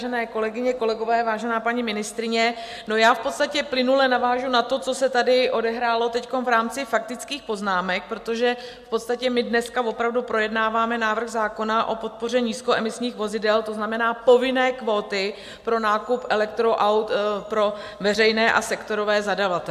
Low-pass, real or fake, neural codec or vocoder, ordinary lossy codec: 14.4 kHz; real; none; AAC, 96 kbps